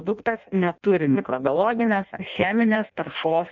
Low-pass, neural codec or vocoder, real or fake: 7.2 kHz; codec, 16 kHz in and 24 kHz out, 0.6 kbps, FireRedTTS-2 codec; fake